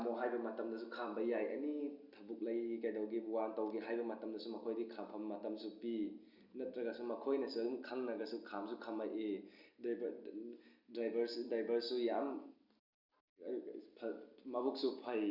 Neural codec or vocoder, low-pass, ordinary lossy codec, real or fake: none; 5.4 kHz; Opus, 64 kbps; real